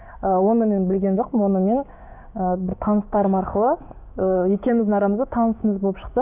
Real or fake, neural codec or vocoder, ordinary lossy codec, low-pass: fake; codec, 16 kHz, 6 kbps, DAC; none; 3.6 kHz